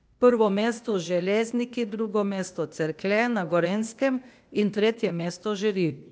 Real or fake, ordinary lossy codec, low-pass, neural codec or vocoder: fake; none; none; codec, 16 kHz, 0.8 kbps, ZipCodec